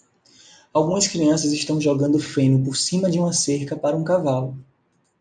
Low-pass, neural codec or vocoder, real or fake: 9.9 kHz; none; real